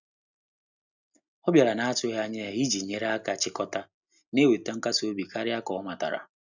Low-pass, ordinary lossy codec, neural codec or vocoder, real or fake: 7.2 kHz; none; none; real